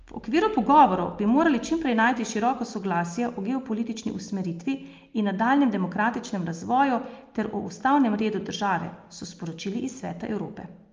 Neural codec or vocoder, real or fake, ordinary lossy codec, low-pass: none; real; Opus, 24 kbps; 7.2 kHz